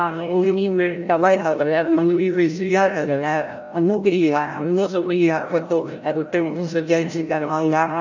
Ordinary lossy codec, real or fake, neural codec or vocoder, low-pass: none; fake; codec, 16 kHz, 0.5 kbps, FreqCodec, larger model; 7.2 kHz